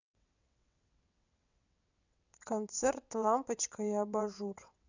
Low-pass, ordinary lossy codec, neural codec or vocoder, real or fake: 7.2 kHz; none; vocoder, 22.05 kHz, 80 mel bands, WaveNeXt; fake